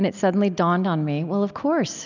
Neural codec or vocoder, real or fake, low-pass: none; real; 7.2 kHz